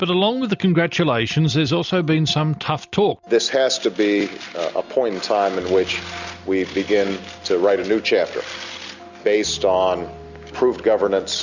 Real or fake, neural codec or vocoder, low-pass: real; none; 7.2 kHz